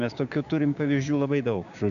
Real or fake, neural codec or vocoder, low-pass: fake; codec, 16 kHz, 6 kbps, DAC; 7.2 kHz